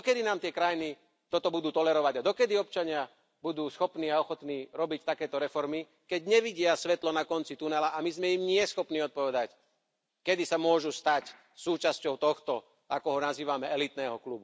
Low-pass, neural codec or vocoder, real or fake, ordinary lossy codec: none; none; real; none